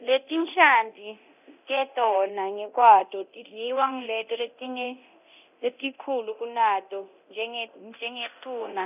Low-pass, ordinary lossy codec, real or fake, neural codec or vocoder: 3.6 kHz; none; fake; codec, 24 kHz, 0.9 kbps, DualCodec